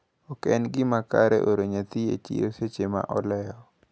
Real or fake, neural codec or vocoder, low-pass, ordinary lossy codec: real; none; none; none